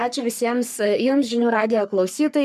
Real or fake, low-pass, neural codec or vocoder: fake; 14.4 kHz; codec, 44.1 kHz, 3.4 kbps, Pupu-Codec